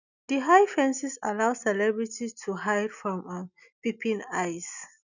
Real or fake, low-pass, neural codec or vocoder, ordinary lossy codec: real; 7.2 kHz; none; none